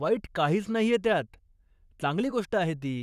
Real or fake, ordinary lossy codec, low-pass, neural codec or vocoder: fake; none; 14.4 kHz; codec, 44.1 kHz, 7.8 kbps, Pupu-Codec